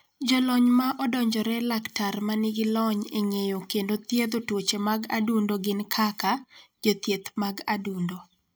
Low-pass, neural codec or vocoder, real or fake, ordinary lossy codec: none; none; real; none